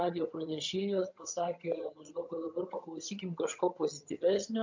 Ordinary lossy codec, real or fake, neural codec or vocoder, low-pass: MP3, 48 kbps; fake; vocoder, 22.05 kHz, 80 mel bands, HiFi-GAN; 7.2 kHz